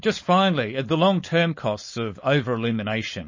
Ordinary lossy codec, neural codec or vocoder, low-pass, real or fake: MP3, 32 kbps; codec, 16 kHz, 4.8 kbps, FACodec; 7.2 kHz; fake